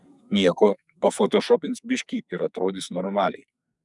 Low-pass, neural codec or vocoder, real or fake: 10.8 kHz; codec, 44.1 kHz, 2.6 kbps, SNAC; fake